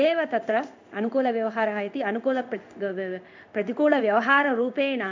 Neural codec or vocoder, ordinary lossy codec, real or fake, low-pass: codec, 16 kHz in and 24 kHz out, 1 kbps, XY-Tokenizer; MP3, 64 kbps; fake; 7.2 kHz